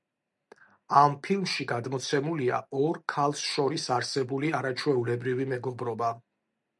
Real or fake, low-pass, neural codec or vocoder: real; 10.8 kHz; none